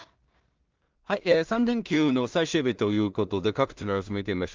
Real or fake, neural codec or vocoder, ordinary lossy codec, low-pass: fake; codec, 16 kHz in and 24 kHz out, 0.4 kbps, LongCat-Audio-Codec, two codebook decoder; Opus, 32 kbps; 7.2 kHz